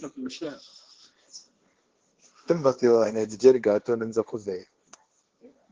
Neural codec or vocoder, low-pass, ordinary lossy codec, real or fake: codec, 16 kHz, 1.1 kbps, Voila-Tokenizer; 7.2 kHz; Opus, 16 kbps; fake